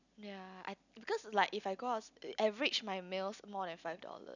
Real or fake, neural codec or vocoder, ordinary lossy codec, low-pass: real; none; none; 7.2 kHz